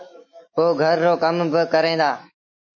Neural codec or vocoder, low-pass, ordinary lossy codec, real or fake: autoencoder, 48 kHz, 128 numbers a frame, DAC-VAE, trained on Japanese speech; 7.2 kHz; MP3, 32 kbps; fake